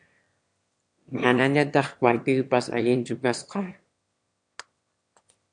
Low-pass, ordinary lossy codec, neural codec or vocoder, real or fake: 9.9 kHz; MP3, 64 kbps; autoencoder, 22.05 kHz, a latent of 192 numbers a frame, VITS, trained on one speaker; fake